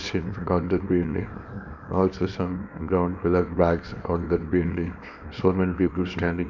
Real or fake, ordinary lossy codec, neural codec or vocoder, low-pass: fake; none; codec, 24 kHz, 0.9 kbps, WavTokenizer, small release; 7.2 kHz